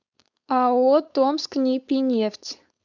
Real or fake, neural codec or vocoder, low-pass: fake; codec, 16 kHz, 4.8 kbps, FACodec; 7.2 kHz